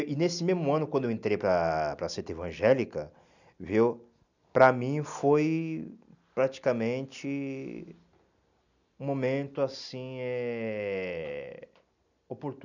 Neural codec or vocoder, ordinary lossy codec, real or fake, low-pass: none; none; real; 7.2 kHz